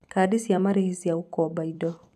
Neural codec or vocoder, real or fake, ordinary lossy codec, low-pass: vocoder, 44.1 kHz, 128 mel bands every 256 samples, BigVGAN v2; fake; none; 14.4 kHz